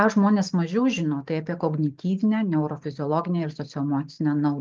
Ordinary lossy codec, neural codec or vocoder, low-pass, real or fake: Opus, 32 kbps; none; 7.2 kHz; real